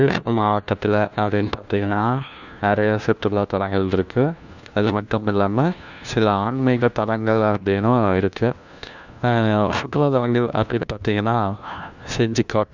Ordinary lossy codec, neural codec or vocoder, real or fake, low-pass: Opus, 64 kbps; codec, 16 kHz, 1 kbps, FunCodec, trained on LibriTTS, 50 frames a second; fake; 7.2 kHz